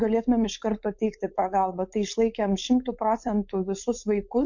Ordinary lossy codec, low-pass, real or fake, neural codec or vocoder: MP3, 48 kbps; 7.2 kHz; fake; codec, 16 kHz, 4.8 kbps, FACodec